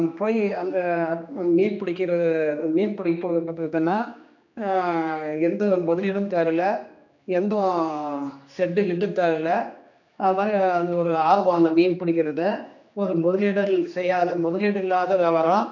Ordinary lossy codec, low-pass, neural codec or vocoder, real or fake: none; 7.2 kHz; codec, 16 kHz, 2 kbps, X-Codec, HuBERT features, trained on general audio; fake